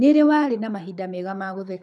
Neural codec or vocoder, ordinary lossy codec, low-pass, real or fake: codec, 24 kHz, 6 kbps, HILCodec; none; none; fake